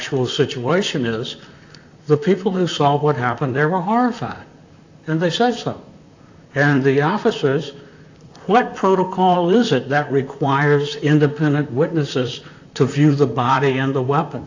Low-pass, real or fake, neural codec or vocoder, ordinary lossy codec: 7.2 kHz; fake; vocoder, 44.1 kHz, 128 mel bands, Pupu-Vocoder; AAC, 48 kbps